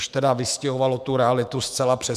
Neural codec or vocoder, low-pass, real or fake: autoencoder, 48 kHz, 128 numbers a frame, DAC-VAE, trained on Japanese speech; 14.4 kHz; fake